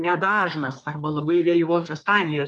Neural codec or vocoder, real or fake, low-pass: codec, 24 kHz, 1 kbps, SNAC; fake; 10.8 kHz